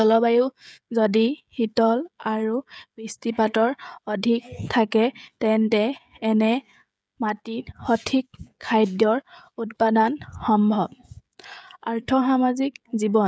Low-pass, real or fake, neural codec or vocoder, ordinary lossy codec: none; fake; codec, 16 kHz, 16 kbps, FreqCodec, smaller model; none